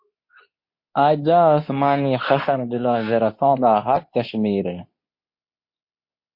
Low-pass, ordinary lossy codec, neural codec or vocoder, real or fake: 5.4 kHz; MP3, 32 kbps; codec, 24 kHz, 0.9 kbps, WavTokenizer, medium speech release version 2; fake